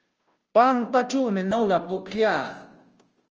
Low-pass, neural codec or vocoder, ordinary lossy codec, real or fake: 7.2 kHz; codec, 16 kHz, 0.5 kbps, FunCodec, trained on Chinese and English, 25 frames a second; Opus, 24 kbps; fake